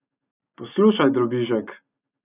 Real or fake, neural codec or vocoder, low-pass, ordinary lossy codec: real; none; 3.6 kHz; none